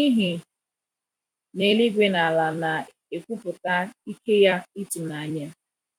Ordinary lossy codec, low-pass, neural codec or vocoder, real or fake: none; 19.8 kHz; vocoder, 44.1 kHz, 128 mel bands every 256 samples, BigVGAN v2; fake